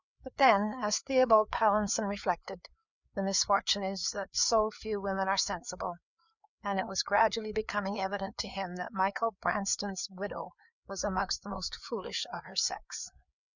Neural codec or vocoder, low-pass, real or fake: codec, 16 kHz, 4 kbps, FreqCodec, larger model; 7.2 kHz; fake